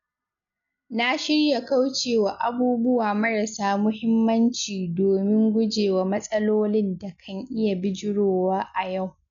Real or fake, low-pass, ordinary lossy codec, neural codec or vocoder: real; 7.2 kHz; none; none